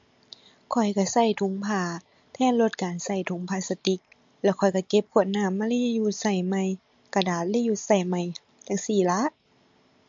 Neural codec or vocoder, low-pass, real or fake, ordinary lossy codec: none; 7.2 kHz; real; MP3, 48 kbps